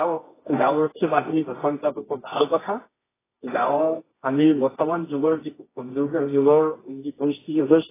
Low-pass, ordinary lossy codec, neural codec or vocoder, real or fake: 3.6 kHz; AAC, 16 kbps; codec, 24 kHz, 0.9 kbps, WavTokenizer, medium music audio release; fake